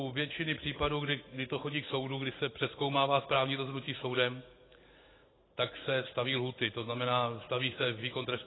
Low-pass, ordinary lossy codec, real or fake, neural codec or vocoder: 7.2 kHz; AAC, 16 kbps; real; none